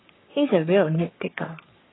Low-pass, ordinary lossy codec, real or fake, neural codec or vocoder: 7.2 kHz; AAC, 16 kbps; fake; codec, 44.1 kHz, 3.4 kbps, Pupu-Codec